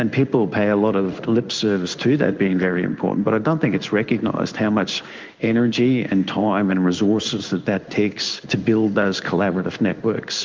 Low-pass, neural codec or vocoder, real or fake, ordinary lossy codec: 7.2 kHz; codec, 16 kHz in and 24 kHz out, 1 kbps, XY-Tokenizer; fake; Opus, 24 kbps